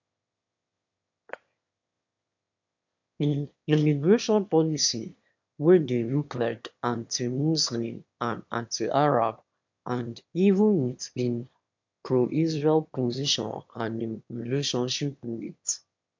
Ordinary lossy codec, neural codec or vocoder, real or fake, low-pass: MP3, 64 kbps; autoencoder, 22.05 kHz, a latent of 192 numbers a frame, VITS, trained on one speaker; fake; 7.2 kHz